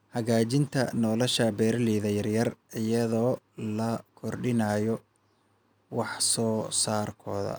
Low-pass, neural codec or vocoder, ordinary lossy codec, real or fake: none; none; none; real